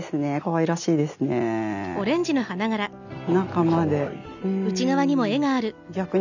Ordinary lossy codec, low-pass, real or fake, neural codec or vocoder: none; 7.2 kHz; real; none